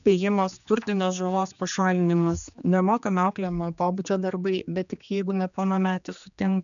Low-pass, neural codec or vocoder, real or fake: 7.2 kHz; codec, 16 kHz, 2 kbps, X-Codec, HuBERT features, trained on general audio; fake